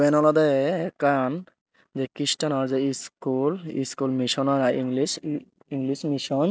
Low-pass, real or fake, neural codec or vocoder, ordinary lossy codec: none; real; none; none